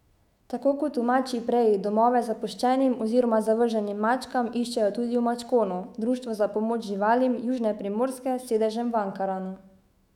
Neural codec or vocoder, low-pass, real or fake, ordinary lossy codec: autoencoder, 48 kHz, 128 numbers a frame, DAC-VAE, trained on Japanese speech; 19.8 kHz; fake; none